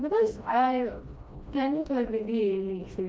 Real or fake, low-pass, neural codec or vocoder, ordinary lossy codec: fake; none; codec, 16 kHz, 1 kbps, FreqCodec, smaller model; none